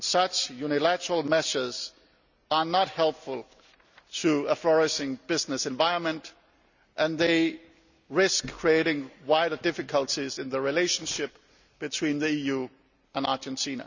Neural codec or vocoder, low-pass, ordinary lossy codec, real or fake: none; 7.2 kHz; none; real